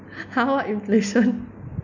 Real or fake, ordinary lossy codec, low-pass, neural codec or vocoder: real; none; 7.2 kHz; none